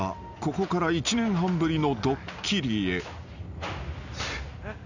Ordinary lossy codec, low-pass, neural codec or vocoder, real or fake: none; 7.2 kHz; none; real